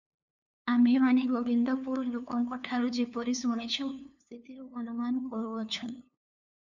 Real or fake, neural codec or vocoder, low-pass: fake; codec, 16 kHz, 2 kbps, FunCodec, trained on LibriTTS, 25 frames a second; 7.2 kHz